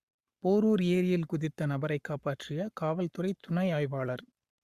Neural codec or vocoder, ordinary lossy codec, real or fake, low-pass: codec, 44.1 kHz, 7.8 kbps, Pupu-Codec; Opus, 64 kbps; fake; 14.4 kHz